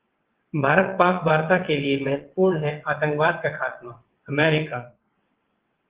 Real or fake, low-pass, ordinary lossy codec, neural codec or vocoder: fake; 3.6 kHz; Opus, 16 kbps; vocoder, 22.05 kHz, 80 mel bands, WaveNeXt